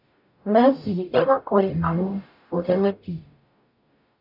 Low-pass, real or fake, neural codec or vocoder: 5.4 kHz; fake; codec, 44.1 kHz, 0.9 kbps, DAC